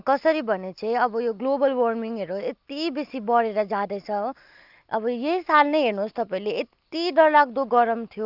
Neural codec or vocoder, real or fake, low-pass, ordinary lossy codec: none; real; 5.4 kHz; Opus, 24 kbps